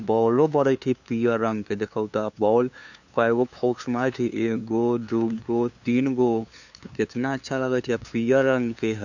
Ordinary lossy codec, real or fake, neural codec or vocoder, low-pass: none; fake; codec, 16 kHz, 2 kbps, FunCodec, trained on LibriTTS, 25 frames a second; 7.2 kHz